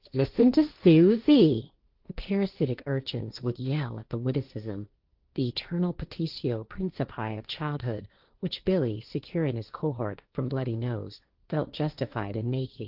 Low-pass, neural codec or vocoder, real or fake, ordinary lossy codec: 5.4 kHz; codec, 16 kHz, 1.1 kbps, Voila-Tokenizer; fake; Opus, 32 kbps